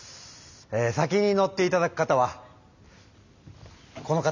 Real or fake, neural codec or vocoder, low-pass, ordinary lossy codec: real; none; 7.2 kHz; none